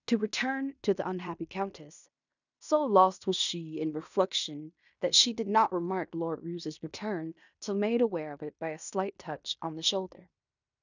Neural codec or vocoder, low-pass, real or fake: codec, 16 kHz in and 24 kHz out, 0.9 kbps, LongCat-Audio-Codec, fine tuned four codebook decoder; 7.2 kHz; fake